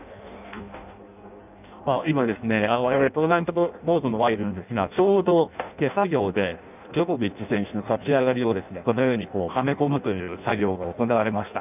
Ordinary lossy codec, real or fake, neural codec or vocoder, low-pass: none; fake; codec, 16 kHz in and 24 kHz out, 0.6 kbps, FireRedTTS-2 codec; 3.6 kHz